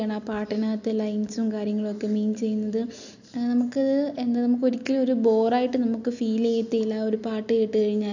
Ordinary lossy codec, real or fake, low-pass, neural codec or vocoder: none; real; 7.2 kHz; none